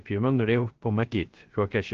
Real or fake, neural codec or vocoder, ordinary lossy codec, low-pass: fake; codec, 16 kHz, 0.3 kbps, FocalCodec; Opus, 16 kbps; 7.2 kHz